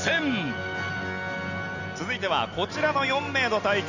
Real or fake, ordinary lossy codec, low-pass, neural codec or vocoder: real; none; 7.2 kHz; none